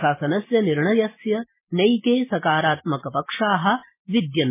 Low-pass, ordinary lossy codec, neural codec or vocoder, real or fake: 3.6 kHz; MP3, 16 kbps; none; real